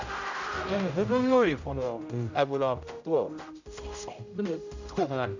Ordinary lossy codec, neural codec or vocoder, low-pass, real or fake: none; codec, 16 kHz, 0.5 kbps, X-Codec, HuBERT features, trained on general audio; 7.2 kHz; fake